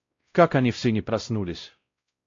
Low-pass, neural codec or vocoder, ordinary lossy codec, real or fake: 7.2 kHz; codec, 16 kHz, 0.5 kbps, X-Codec, WavLM features, trained on Multilingual LibriSpeech; AAC, 48 kbps; fake